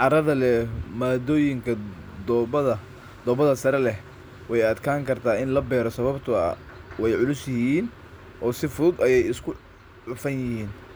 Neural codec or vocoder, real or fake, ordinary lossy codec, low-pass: none; real; none; none